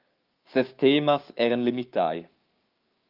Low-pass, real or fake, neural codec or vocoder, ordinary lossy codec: 5.4 kHz; fake; codec, 16 kHz, 6 kbps, DAC; Opus, 24 kbps